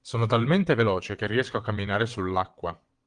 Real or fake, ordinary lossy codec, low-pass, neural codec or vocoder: fake; Opus, 24 kbps; 9.9 kHz; vocoder, 22.05 kHz, 80 mel bands, WaveNeXt